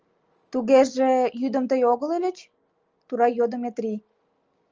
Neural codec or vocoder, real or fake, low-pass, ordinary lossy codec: none; real; 7.2 kHz; Opus, 24 kbps